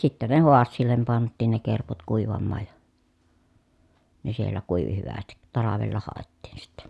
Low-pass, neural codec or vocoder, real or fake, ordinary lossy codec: none; none; real; none